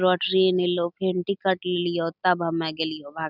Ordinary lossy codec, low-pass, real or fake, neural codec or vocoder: none; 5.4 kHz; real; none